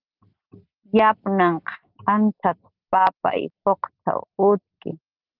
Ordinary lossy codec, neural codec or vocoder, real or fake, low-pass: Opus, 32 kbps; none; real; 5.4 kHz